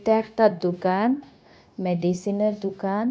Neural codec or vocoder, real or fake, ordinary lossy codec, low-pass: codec, 16 kHz, 0.9 kbps, LongCat-Audio-Codec; fake; none; none